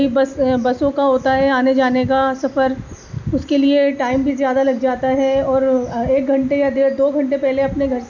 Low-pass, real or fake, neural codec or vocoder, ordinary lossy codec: 7.2 kHz; real; none; none